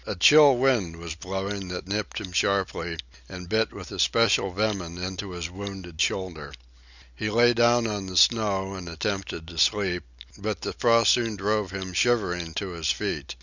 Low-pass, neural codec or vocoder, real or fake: 7.2 kHz; none; real